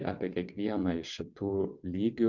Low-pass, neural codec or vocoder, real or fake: 7.2 kHz; vocoder, 22.05 kHz, 80 mel bands, WaveNeXt; fake